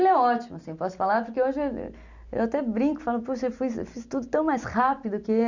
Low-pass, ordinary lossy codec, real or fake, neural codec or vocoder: 7.2 kHz; none; real; none